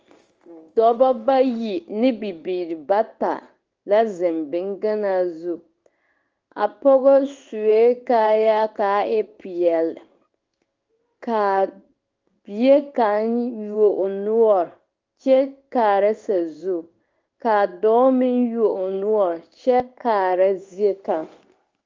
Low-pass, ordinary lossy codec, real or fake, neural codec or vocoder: 7.2 kHz; Opus, 24 kbps; fake; codec, 16 kHz in and 24 kHz out, 1 kbps, XY-Tokenizer